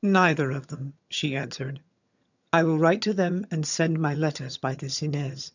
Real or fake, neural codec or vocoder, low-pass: fake; vocoder, 22.05 kHz, 80 mel bands, HiFi-GAN; 7.2 kHz